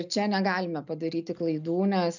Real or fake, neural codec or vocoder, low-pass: real; none; 7.2 kHz